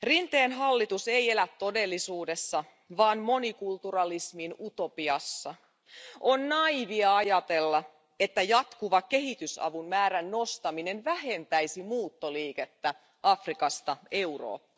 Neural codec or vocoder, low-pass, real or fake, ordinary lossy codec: none; none; real; none